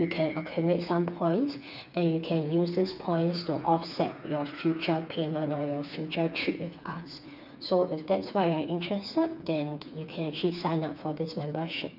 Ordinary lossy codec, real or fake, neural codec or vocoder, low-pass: none; fake; codec, 16 kHz, 4 kbps, FreqCodec, smaller model; 5.4 kHz